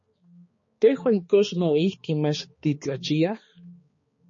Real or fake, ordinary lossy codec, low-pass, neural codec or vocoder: fake; MP3, 32 kbps; 7.2 kHz; codec, 16 kHz, 2 kbps, X-Codec, HuBERT features, trained on balanced general audio